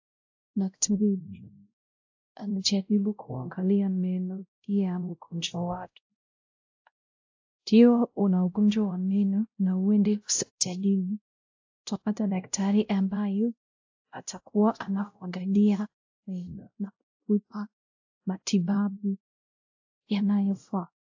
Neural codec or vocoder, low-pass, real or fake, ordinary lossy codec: codec, 16 kHz, 0.5 kbps, X-Codec, WavLM features, trained on Multilingual LibriSpeech; 7.2 kHz; fake; AAC, 48 kbps